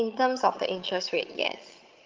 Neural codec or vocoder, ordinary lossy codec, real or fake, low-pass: vocoder, 22.05 kHz, 80 mel bands, HiFi-GAN; Opus, 32 kbps; fake; 7.2 kHz